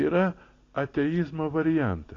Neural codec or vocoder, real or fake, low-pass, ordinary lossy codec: none; real; 7.2 kHz; AAC, 32 kbps